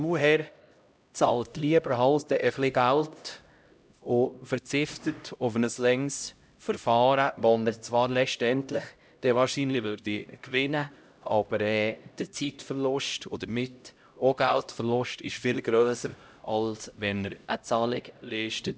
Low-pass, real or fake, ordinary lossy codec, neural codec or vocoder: none; fake; none; codec, 16 kHz, 0.5 kbps, X-Codec, HuBERT features, trained on LibriSpeech